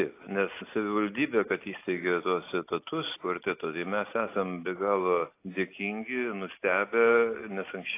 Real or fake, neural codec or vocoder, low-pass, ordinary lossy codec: real; none; 3.6 kHz; AAC, 24 kbps